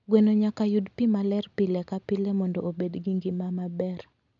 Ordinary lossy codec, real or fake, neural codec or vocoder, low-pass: none; real; none; 7.2 kHz